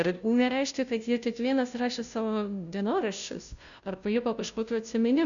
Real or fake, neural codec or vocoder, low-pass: fake; codec, 16 kHz, 0.5 kbps, FunCodec, trained on Chinese and English, 25 frames a second; 7.2 kHz